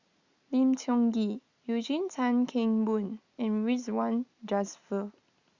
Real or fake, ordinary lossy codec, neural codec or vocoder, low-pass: real; Opus, 64 kbps; none; 7.2 kHz